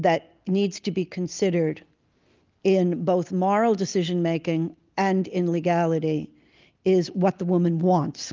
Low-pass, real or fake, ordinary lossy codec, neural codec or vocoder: 7.2 kHz; real; Opus, 32 kbps; none